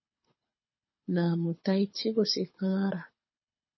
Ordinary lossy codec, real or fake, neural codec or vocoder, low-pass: MP3, 24 kbps; fake; codec, 24 kHz, 6 kbps, HILCodec; 7.2 kHz